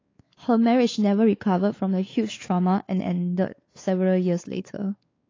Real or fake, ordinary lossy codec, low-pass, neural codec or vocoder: fake; AAC, 32 kbps; 7.2 kHz; codec, 16 kHz, 4 kbps, X-Codec, WavLM features, trained on Multilingual LibriSpeech